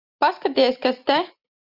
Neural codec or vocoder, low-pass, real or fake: none; 5.4 kHz; real